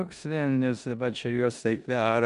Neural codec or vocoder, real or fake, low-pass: codec, 16 kHz in and 24 kHz out, 0.9 kbps, LongCat-Audio-Codec, four codebook decoder; fake; 10.8 kHz